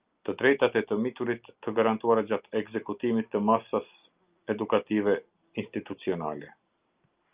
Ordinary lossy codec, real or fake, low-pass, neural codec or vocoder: Opus, 24 kbps; real; 3.6 kHz; none